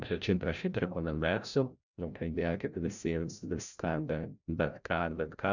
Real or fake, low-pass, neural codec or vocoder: fake; 7.2 kHz; codec, 16 kHz, 0.5 kbps, FreqCodec, larger model